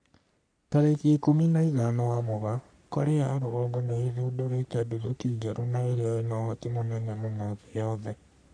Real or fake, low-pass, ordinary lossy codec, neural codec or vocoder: fake; 9.9 kHz; none; codec, 44.1 kHz, 3.4 kbps, Pupu-Codec